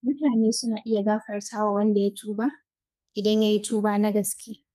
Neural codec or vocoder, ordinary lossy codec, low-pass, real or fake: codec, 32 kHz, 1.9 kbps, SNAC; none; 14.4 kHz; fake